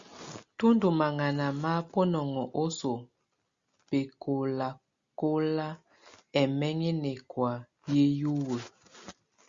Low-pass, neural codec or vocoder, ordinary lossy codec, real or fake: 7.2 kHz; none; Opus, 64 kbps; real